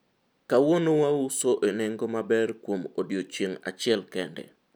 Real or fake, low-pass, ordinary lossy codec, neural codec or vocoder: real; none; none; none